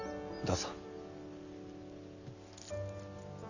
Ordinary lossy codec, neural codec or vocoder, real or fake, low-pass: none; none; real; 7.2 kHz